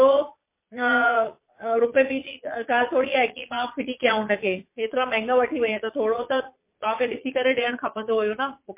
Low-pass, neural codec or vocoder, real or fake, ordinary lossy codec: 3.6 kHz; vocoder, 22.05 kHz, 80 mel bands, Vocos; fake; MP3, 24 kbps